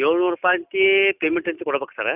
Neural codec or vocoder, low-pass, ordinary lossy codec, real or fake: none; 3.6 kHz; none; real